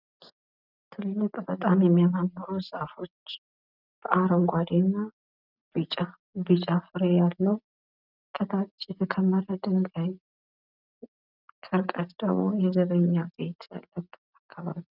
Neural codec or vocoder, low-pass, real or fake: none; 5.4 kHz; real